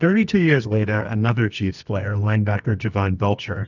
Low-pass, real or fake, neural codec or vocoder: 7.2 kHz; fake; codec, 24 kHz, 0.9 kbps, WavTokenizer, medium music audio release